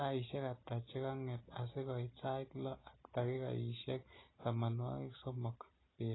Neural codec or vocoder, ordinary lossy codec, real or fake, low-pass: none; AAC, 16 kbps; real; 7.2 kHz